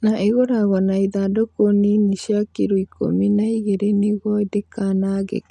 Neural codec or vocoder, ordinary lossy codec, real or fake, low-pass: none; none; real; none